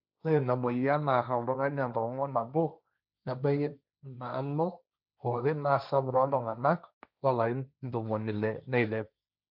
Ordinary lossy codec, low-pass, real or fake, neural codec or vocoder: none; 5.4 kHz; fake; codec, 16 kHz, 1.1 kbps, Voila-Tokenizer